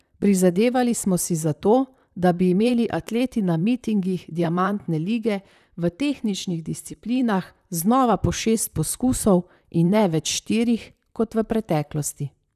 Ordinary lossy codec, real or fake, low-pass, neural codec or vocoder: none; fake; 14.4 kHz; vocoder, 44.1 kHz, 128 mel bands, Pupu-Vocoder